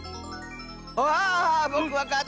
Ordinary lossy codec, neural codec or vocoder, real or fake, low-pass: none; none; real; none